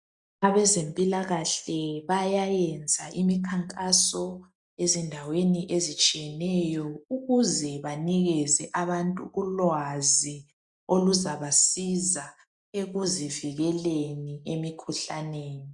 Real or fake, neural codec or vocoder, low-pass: real; none; 10.8 kHz